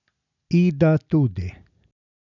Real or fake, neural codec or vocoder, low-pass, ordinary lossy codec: real; none; 7.2 kHz; none